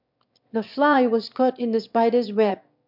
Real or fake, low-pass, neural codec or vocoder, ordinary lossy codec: fake; 5.4 kHz; autoencoder, 22.05 kHz, a latent of 192 numbers a frame, VITS, trained on one speaker; MP3, 48 kbps